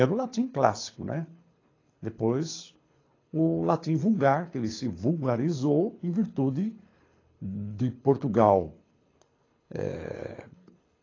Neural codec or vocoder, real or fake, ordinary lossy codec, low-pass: codec, 24 kHz, 6 kbps, HILCodec; fake; AAC, 32 kbps; 7.2 kHz